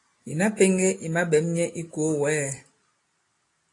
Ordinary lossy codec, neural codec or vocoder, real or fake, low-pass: AAC, 48 kbps; none; real; 10.8 kHz